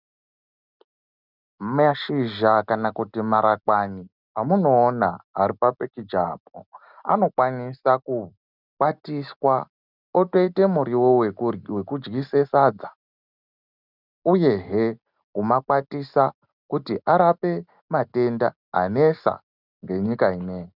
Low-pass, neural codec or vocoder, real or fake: 5.4 kHz; none; real